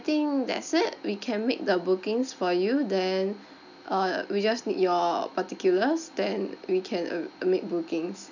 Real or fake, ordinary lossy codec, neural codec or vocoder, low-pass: real; none; none; 7.2 kHz